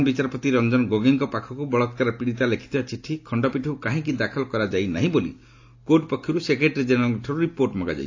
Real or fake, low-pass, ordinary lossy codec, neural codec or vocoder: real; 7.2 kHz; AAC, 48 kbps; none